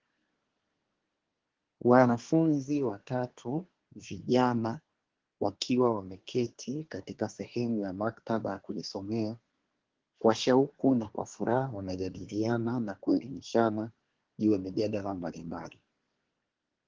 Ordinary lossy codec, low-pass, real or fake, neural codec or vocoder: Opus, 16 kbps; 7.2 kHz; fake; codec, 24 kHz, 1 kbps, SNAC